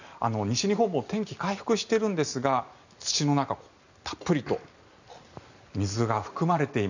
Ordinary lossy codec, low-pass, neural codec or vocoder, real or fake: none; 7.2 kHz; none; real